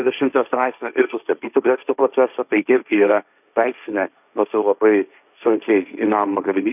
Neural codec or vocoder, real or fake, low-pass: codec, 16 kHz, 1.1 kbps, Voila-Tokenizer; fake; 3.6 kHz